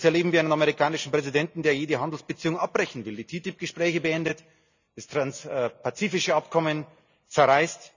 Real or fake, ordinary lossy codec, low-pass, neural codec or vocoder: real; none; 7.2 kHz; none